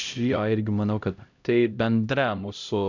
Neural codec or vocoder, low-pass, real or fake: codec, 16 kHz, 0.5 kbps, X-Codec, HuBERT features, trained on LibriSpeech; 7.2 kHz; fake